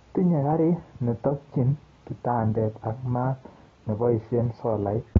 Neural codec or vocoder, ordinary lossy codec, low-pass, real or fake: none; AAC, 24 kbps; 7.2 kHz; real